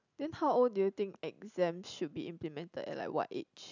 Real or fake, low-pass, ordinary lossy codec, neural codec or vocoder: real; 7.2 kHz; none; none